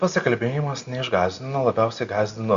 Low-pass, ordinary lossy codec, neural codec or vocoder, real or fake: 7.2 kHz; Opus, 64 kbps; none; real